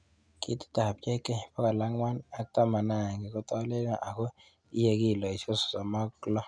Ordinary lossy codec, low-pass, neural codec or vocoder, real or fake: none; 9.9 kHz; none; real